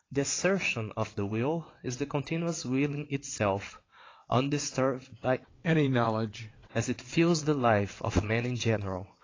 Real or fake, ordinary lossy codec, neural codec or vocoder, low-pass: fake; AAC, 32 kbps; vocoder, 22.05 kHz, 80 mel bands, WaveNeXt; 7.2 kHz